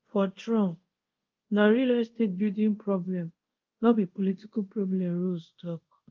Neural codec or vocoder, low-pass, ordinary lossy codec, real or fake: codec, 24 kHz, 0.5 kbps, DualCodec; 7.2 kHz; Opus, 32 kbps; fake